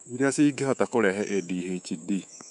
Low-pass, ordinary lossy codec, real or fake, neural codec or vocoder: 10.8 kHz; none; fake; codec, 24 kHz, 3.1 kbps, DualCodec